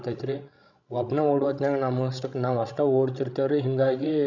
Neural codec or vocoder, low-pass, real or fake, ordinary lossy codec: codec, 16 kHz, 16 kbps, FreqCodec, larger model; 7.2 kHz; fake; none